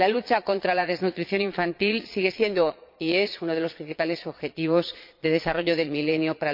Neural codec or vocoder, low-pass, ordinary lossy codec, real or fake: vocoder, 22.05 kHz, 80 mel bands, Vocos; 5.4 kHz; MP3, 48 kbps; fake